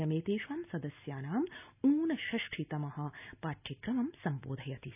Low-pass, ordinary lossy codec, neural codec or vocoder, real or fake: 3.6 kHz; none; none; real